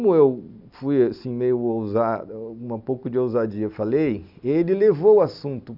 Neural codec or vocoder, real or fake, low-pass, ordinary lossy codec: none; real; 5.4 kHz; none